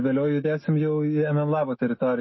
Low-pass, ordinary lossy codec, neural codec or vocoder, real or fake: 7.2 kHz; MP3, 24 kbps; autoencoder, 48 kHz, 128 numbers a frame, DAC-VAE, trained on Japanese speech; fake